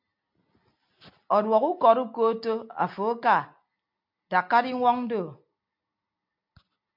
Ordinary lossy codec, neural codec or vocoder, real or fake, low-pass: MP3, 48 kbps; none; real; 5.4 kHz